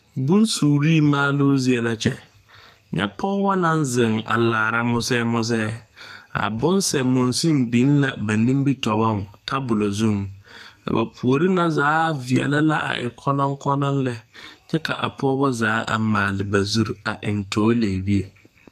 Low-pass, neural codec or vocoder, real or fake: 14.4 kHz; codec, 44.1 kHz, 2.6 kbps, SNAC; fake